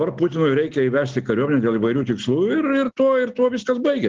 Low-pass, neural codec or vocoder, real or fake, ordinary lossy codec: 7.2 kHz; none; real; Opus, 16 kbps